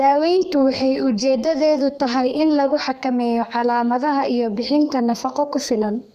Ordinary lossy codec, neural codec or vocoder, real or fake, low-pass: none; codec, 32 kHz, 1.9 kbps, SNAC; fake; 14.4 kHz